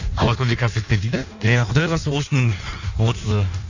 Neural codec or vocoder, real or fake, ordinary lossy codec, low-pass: codec, 16 kHz in and 24 kHz out, 1.1 kbps, FireRedTTS-2 codec; fake; none; 7.2 kHz